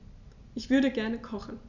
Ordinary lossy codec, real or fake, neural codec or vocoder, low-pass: none; real; none; 7.2 kHz